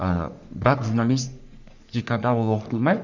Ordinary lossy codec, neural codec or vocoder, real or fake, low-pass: none; codec, 44.1 kHz, 3.4 kbps, Pupu-Codec; fake; 7.2 kHz